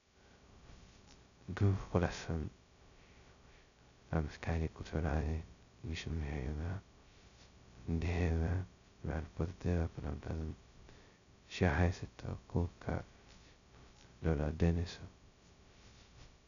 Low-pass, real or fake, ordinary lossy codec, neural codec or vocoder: 7.2 kHz; fake; none; codec, 16 kHz, 0.2 kbps, FocalCodec